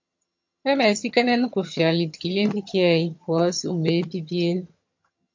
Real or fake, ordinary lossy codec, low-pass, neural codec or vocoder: fake; MP3, 48 kbps; 7.2 kHz; vocoder, 22.05 kHz, 80 mel bands, HiFi-GAN